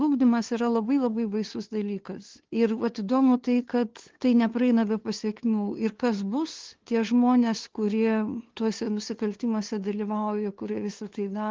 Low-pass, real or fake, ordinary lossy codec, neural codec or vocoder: 7.2 kHz; fake; Opus, 16 kbps; codec, 16 kHz, 2 kbps, FunCodec, trained on LibriTTS, 25 frames a second